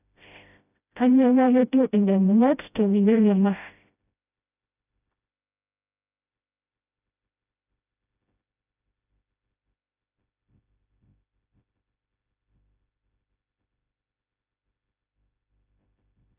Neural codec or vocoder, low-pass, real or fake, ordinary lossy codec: codec, 16 kHz, 0.5 kbps, FreqCodec, smaller model; 3.6 kHz; fake; none